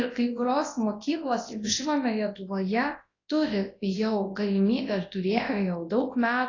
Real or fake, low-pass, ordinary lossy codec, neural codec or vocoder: fake; 7.2 kHz; AAC, 32 kbps; codec, 24 kHz, 0.9 kbps, WavTokenizer, large speech release